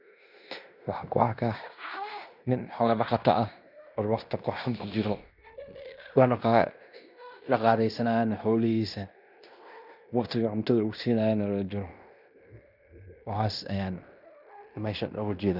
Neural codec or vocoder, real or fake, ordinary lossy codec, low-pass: codec, 16 kHz in and 24 kHz out, 0.9 kbps, LongCat-Audio-Codec, fine tuned four codebook decoder; fake; none; 5.4 kHz